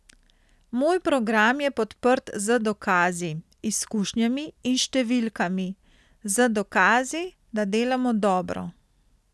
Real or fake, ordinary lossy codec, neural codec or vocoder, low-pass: real; none; none; none